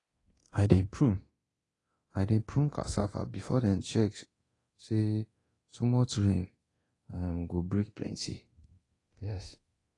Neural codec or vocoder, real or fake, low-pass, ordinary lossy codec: codec, 24 kHz, 0.9 kbps, DualCodec; fake; 10.8 kHz; AAC, 32 kbps